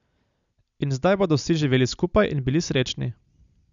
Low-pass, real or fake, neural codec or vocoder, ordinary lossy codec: 7.2 kHz; real; none; none